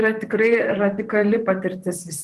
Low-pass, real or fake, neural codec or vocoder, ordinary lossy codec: 14.4 kHz; fake; vocoder, 44.1 kHz, 128 mel bands, Pupu-Vocoder; Opus, 24 kbps